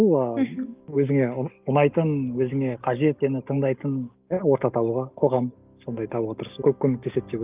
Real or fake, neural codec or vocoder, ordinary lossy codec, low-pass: real; none; Opus, 24 kbps; 3.6 kHz